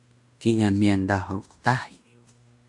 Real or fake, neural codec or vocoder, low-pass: fake; codec, 16 kHz in and 24 kHz out, 0.9 kbps, LongCat-Audio-Codec, fine tuned four codebook decoder; 10.8 kHz